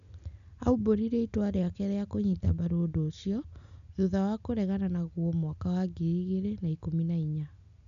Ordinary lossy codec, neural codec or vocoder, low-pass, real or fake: none; none; 7.2 kHz; real